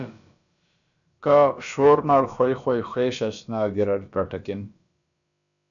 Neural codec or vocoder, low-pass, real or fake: codec, 16 kHz, about 1 kbps, DyCAST, with the encoder's durations; 7.2 kHz; fake